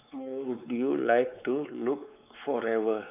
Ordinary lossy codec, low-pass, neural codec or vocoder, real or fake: none; 3.6 kHz; codec, 16 kHz, 4 kbps, X-Codec, WavLM features, trained on Multilingual LibriSpeech; fake